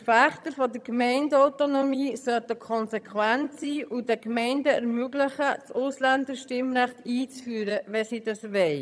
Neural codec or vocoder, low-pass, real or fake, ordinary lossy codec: vocoder, 22.05 kHz, 80 mel bands, HiFi-GAN; none; fake; none